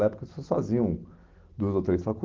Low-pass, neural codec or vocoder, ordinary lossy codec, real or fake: 7.2 kHz; none; Opus, 16 kbps; real